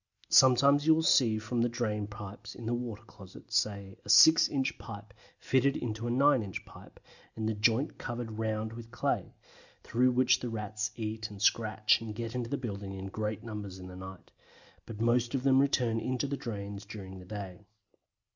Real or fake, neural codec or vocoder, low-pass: real; none; 7.2 kHz